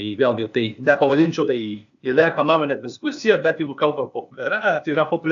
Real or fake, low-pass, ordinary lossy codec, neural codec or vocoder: fake; 7.2 kHz; AAC, 64 kbps; codec, 16 kHz, 0.8 kbps, ZipCodec